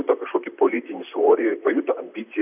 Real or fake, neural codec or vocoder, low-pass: fake; vocoder, 44.1 kHz, 128 mel bands, Pupu-Vocoder; 3.6 kHz